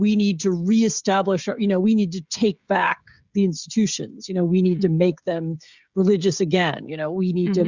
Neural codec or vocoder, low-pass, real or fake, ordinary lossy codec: codec, 16 kHz, 16 kbps, FreqCodec, smaller model; 7.2 kHz; fake; Opus, 64 kbps